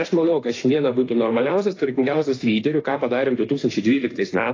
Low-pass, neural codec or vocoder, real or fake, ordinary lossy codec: 7.2 kHz; autoencoder, 48 kHz, 32 numbers a frame, DAC-VAE, trained on Japanese speech; fake; AAC, 32 kbps